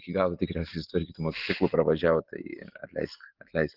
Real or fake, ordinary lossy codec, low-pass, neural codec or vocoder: real; Opus, 16 kbps; 5.4 kHz; none